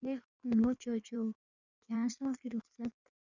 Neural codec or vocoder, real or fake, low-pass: codec, 16 kHz in and 24 kHz out, 1.1 kbps, FireRedTTS-2 codec; fake; 7.2 kHz